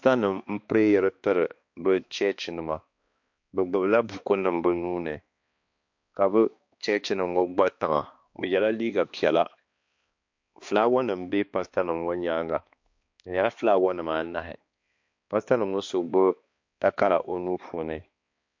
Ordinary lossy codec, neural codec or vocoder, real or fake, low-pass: MP3, 48 kbps; codec, 16 kHz, 2 kbps, X-Codec, HuBERT features, trained on balanced general audio; fake; 7.2 kHz